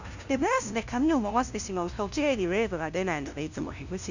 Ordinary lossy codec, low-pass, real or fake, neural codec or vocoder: none; 7.2 kHz; fake; codec, 16 kHz, 0.5 kbps, FunCodec, trained on LibriTTS, 25 frames a second